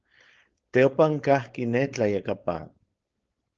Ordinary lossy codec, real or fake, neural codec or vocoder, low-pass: Opus, 16 kbps; fake; codec, 16 kHz, 4.8 kbps, FACodec; 7.2 kHz